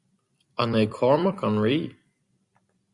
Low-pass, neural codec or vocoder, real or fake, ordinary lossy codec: 10.8 kHz; vocoder, 44.1 kHz, 128 mel bands every 256 samples, BigVGAN v2; fake; Opus, 64 kbps